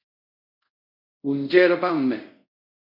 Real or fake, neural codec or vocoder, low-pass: fake; codec, 24 kHz, 0.5 kbps, DualCodec; 5.4 kHz